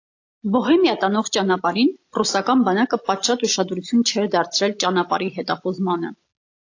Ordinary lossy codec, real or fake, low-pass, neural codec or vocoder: AAC, 48 kbps; real; 7.2 kHz; none